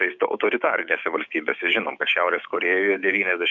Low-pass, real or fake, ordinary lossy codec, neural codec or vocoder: 7.2 kHz; fake; MP3, 64 kbps; codec, 16 kHz, 6 kbps, DAC